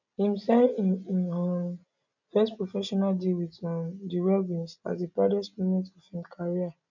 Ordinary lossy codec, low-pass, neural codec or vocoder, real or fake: none; 7.2 kHz; none; real